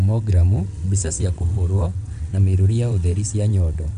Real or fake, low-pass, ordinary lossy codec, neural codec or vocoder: fake; 9.9 kHz; none; vocoder, 22.05 kHz, 80 mel bands, WaveNeXt